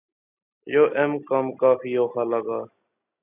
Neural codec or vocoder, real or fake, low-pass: none; real; 3.6 kHz